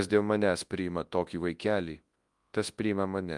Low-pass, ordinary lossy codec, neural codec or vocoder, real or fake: 10.8 kHz; Opus, 32 kbps; codec, 24 kHz, 0.9 kbps, WavTokenizer, large speech release; fake